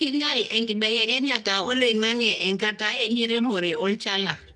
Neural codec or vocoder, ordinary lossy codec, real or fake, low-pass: codec, 24 kHz, 0.9 kbps, WavTokenizer, medium music audio release; none; fake; 10.8 kHz